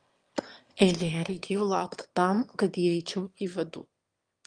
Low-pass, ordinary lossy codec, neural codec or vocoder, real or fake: 9.9 kHz; Opus, 32 kbps; autoencoder, 22.05 kHz, a latent of 192 numbers a frame, VITS, trained on one speaker; fake